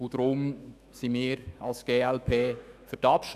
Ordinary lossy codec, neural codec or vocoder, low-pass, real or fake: none; autoencoder, 48 kHz, 128 numbers a frame, DAC-VAE, trained on Japanese speech; 14.4 kHz; fake